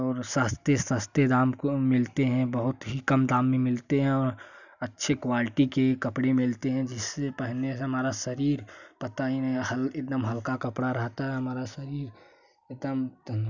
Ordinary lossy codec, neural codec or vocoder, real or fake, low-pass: none; none; real; 7.2 kHz